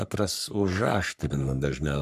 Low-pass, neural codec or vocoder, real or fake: 14.4 kHz; codec, 44.1 kHz, 3.4 kbps, Pupu-Codec; fake